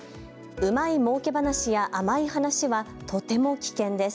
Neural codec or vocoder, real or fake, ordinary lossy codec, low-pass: none; real; none; none